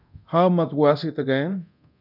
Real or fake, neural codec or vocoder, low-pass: fake; codec, 24 kHz, 0.9 kbps, DualCodec; 5.4 kHz